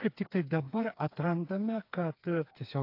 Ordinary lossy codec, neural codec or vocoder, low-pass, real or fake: AAC, 32 kbps; codec, 16 kHz, 4 kbps, FreqCodec, smaller model; 5.4 kHz; fake